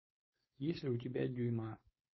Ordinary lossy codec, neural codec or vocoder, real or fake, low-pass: MP3, 24 kbps; codec, 16 kHz, 4.8 kbps, FACodec; fake; 7.2 kHz